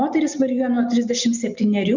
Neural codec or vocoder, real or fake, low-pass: none; real; 7.2 kHz